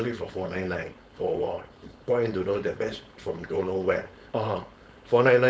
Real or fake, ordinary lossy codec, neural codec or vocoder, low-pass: fake; none; codec, 16 kHz, 4.8 kbps, FACodec; none